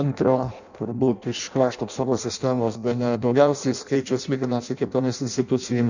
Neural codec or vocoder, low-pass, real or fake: codec, 16 kHz in and 24 kHz out, 0.6 kbps, FireRedTTS-2 codec; 7.2 kHz; fake